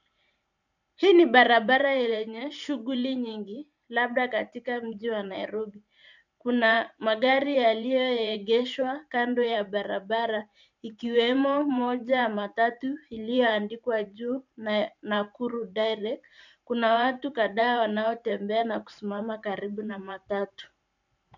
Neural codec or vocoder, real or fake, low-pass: vocoder, 44.1 kHz, 128 mel bands every 512 samples, BigVGAN v2; fake; 7.2 kHz